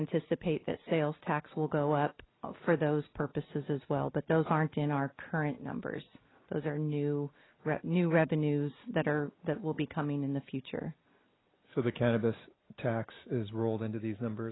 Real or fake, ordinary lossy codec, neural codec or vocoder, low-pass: real; AAC, 16 kbps; none; 7.2 kHz